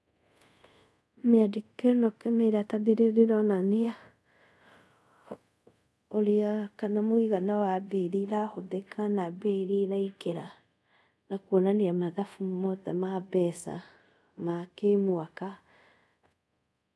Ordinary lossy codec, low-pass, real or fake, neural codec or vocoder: none; none; fake; codec, 24 kHz, 0.5 kbps, DualCodec